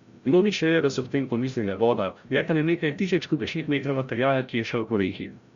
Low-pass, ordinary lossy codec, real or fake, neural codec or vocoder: 7.2 kHz; Opus, 64 kbps; fake; codec, 16 kHz, 0.5 kbps, FreqCodec, larger model